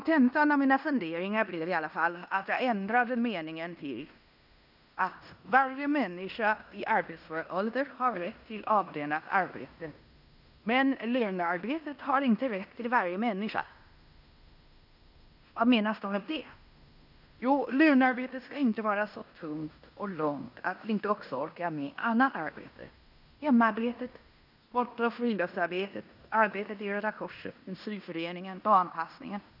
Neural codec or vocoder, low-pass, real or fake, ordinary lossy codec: codec, 16 kHz in and 24 kHz out, 0.9 kbps, LongCat-Audio-Codec, fine tuned four codebook decoder; 5.4 kHz; fake; none